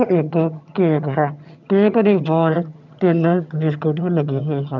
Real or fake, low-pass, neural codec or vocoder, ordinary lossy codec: fake; 7.2 kHz; vocoder, 22.05 kHz, 80 mel bands, HiFi-GAN; none